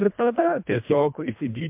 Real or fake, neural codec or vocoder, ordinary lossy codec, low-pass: fake; codec, 24 kHz, 1.5 kbps, HILCodec; MP3, 32 kbps; 3.6 kHz